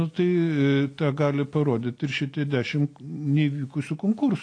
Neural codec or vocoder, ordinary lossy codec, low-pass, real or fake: none; AAC, 48 kbps; 9.9 kHz; real